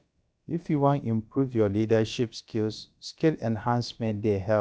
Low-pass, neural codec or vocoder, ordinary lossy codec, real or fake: none; codec, 16 kHz, about 1 kbps, DyCAST, with the encoder's durations; none; fake